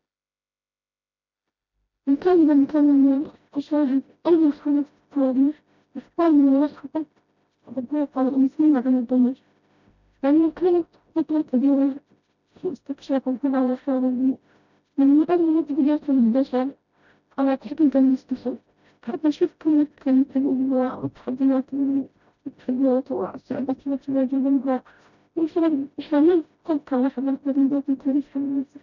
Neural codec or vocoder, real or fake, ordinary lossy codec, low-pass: codec, 16 kHz, 0.5 kbps, FreqCodec, smaller model; fake; none; 7.2 kHz